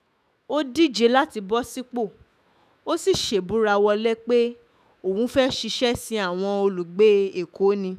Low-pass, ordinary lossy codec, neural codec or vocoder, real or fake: 14.4 kHz; none; autoencoder, 48 kHz, 128 numbers a frame, DAC-VAE, trained on Japanese speech; fake